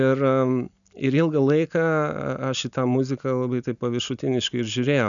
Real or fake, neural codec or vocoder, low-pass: real; none; 7.2 kHz